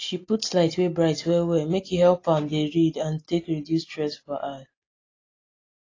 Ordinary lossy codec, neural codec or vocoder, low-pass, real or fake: AAC, 32 kbps; none; 7.2 kHz; real